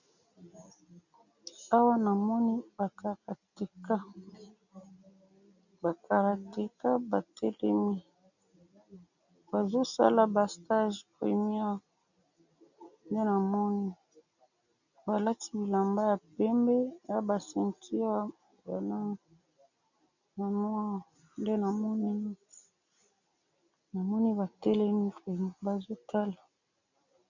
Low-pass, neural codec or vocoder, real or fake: 7.2 kHz; none; real